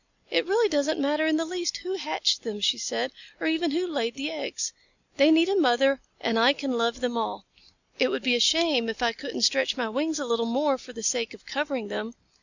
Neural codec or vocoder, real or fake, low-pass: none; real; 7.2 kHz